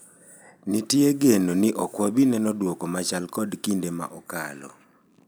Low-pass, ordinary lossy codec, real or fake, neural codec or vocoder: none; none; real; none